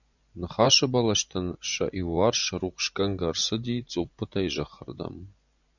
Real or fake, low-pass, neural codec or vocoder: fake; 7.2 kHz; vocoder, 24 kHz, 100 mel bands, Vocos